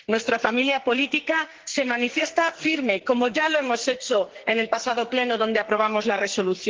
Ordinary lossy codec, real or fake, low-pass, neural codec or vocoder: Opus, 16 kbps; fake; 7.2 kHz; codec, 44.1 kHz, 2.6 kbps, SNAC